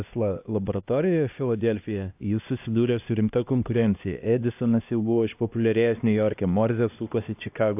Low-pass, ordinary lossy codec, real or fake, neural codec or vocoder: 3.6 kHz; AAC, 32 kbps; fake; codec, 16 kHz, 1 kbps, X-Codec, HuBERT features, trained on LibriSpeech